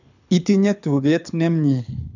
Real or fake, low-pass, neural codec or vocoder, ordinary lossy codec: fake; 7.2 kHz; codec, 24 kHz, 6 kbps, HILCodec; none